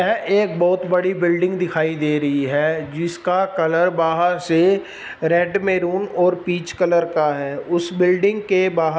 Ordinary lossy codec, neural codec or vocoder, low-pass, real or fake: none; none; none; real